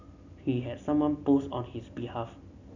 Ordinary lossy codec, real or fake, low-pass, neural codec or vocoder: none; real; 7.2 kHz; none